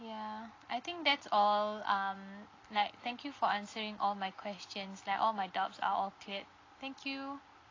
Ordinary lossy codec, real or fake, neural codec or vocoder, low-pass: AAC, 32 kbps; real; none; 7.2 kHz